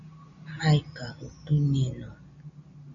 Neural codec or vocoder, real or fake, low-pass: none; real; 7.2 kHz